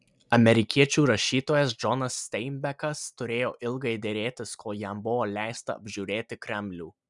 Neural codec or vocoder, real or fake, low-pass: none; real; 10.8 kHz